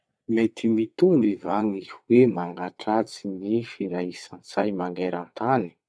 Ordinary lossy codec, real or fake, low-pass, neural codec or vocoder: none; fake; none; vocoder, 22.05 kHz, 80 mel bands, WaveNeXt